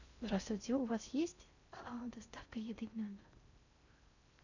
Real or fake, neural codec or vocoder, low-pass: fake; codec, 16 kHz in and 24 kHz out, 0.6 kbps, FocalCodec, streaming, 4096 codes; 7.2 kHz